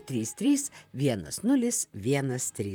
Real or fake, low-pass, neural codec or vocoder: fake; 19.8 kHz; vocoder, 44.1 kHz, 128 mel bands, Pupu-Vocoder